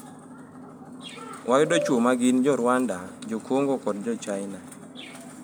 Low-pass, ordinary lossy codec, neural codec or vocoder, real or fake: none; none; none; real